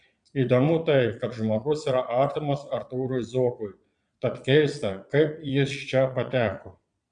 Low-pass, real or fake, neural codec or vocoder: 9.9 kHz; fake; vocoder, 22.05 kHz, 80 mel bands, Vocos